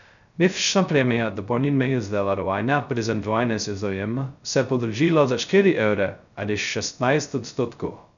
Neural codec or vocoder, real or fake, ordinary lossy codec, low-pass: codec, 16 kHz, 0.2 kbps, FocalCodec; fake; none; 7.2 kHz